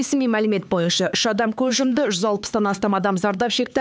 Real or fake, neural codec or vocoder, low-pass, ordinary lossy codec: fake; codec, 16 kHz, 4 kbps, X-Codec, HuBERT features, trained on LibriSpeech; none; none